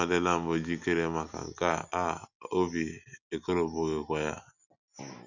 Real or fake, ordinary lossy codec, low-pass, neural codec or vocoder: real; none; 7.2 kHz; none